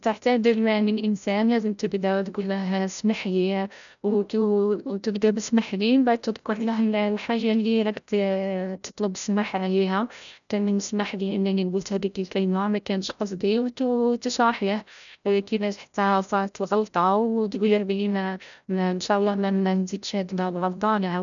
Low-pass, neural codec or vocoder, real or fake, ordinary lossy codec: 7.2 kHz; codec, 16 kHz, 0.5 kbps, FreqCodec, larger model; fake; none